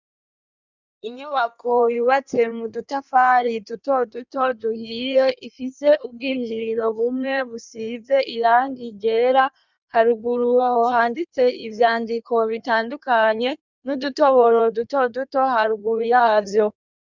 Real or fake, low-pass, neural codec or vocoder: fake; 7.2 kHz; codec, 16 kHz in and 24 kHz out, 1.1 kbps, FireRedTTS-2 codec